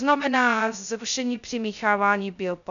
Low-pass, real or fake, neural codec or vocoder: 7.2 kHz; fake; codec, 16 kHz, 0.2 kbps, FocalCodec